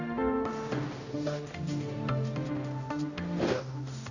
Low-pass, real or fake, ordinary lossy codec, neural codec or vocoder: 7.2 kHz; fake; none; codec, 16 kHz, 0.5 kbps, X-Codec, HuBERT features, trained on general audio